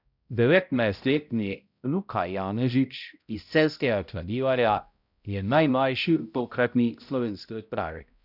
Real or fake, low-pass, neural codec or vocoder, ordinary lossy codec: fake; 5.4 kHz; codec, 16 kHz, 0.5 kbps, X-Codec, HuBERT features, trained on balanced general audio; none